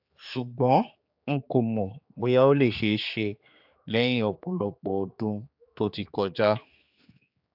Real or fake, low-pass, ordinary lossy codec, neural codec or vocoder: fake; 5.4 kHz; AAC, 48 kbps; codec, 16 kHz, 4 kbps, X-Codec, HuBERT features, trained on general audio